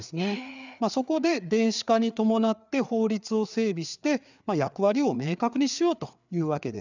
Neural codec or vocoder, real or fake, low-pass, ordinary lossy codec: codec, 16 kHz, 4 kbps, FreqCodec, larger model; fake; 7.2 kHz; none